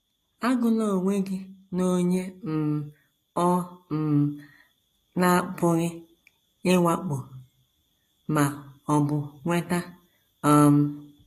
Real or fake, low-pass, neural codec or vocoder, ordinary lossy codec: real; 14.4 kHz; none; AAC, 48 kbps